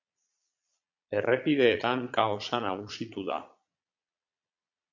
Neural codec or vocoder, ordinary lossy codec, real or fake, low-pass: vocoder, 22.05 kHz, 80 mel bands, Vocos; MP3, 64 kbps; fake; 7.2 kHz